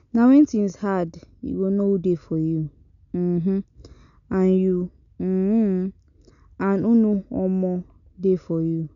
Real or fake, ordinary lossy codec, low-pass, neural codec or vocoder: real; none; 7.2 kHz; none